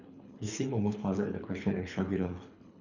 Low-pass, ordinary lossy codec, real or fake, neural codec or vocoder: 7.2 kHz; none; fake; codec, 24 kHz, 3 kbps, HILCodec